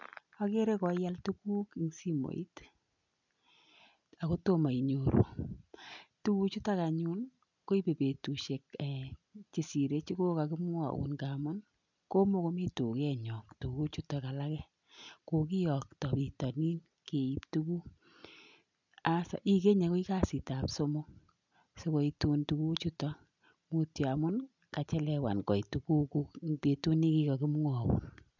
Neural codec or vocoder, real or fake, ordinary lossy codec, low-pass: none; real; none; 7.2 kHz